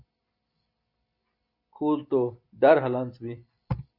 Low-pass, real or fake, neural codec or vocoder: 5.4 kHz; real; none